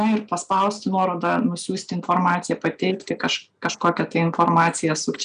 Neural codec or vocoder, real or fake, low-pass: none; real; 9.9 kHz